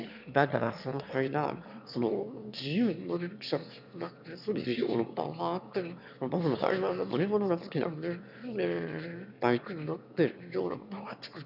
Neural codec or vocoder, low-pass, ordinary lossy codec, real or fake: autoencoder, 22.05 kHz, a latent of 192 numbers a frame, VITS, trained on one speaker; 5.4 kHz; none; fake